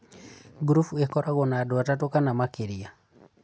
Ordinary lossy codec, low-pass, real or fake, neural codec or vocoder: none; none; real; none